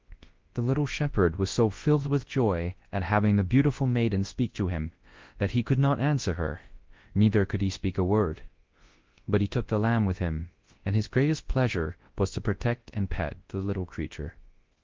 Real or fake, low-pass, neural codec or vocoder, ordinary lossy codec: fake; 7.2 kHz; codec, 24 kHz, 0.9 kbps, WavTokenizer, large speech release; Opus, 16 kbps